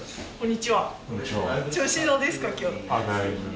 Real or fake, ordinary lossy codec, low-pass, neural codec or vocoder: real; none; none; none